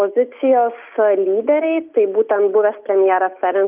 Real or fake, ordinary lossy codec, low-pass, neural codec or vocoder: real; Opus, 24 kbps; 3.6 kHz; none